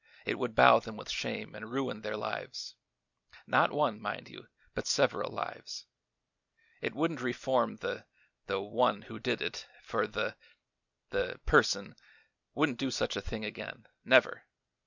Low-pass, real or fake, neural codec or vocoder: 7.2 kHz; real; none